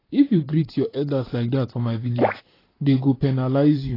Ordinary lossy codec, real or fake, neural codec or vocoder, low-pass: AAC, 24 kbps; fake; vocoder, 44.1 kHz, 128 mel bands, Pupu-Vocoder; 5.4 kHz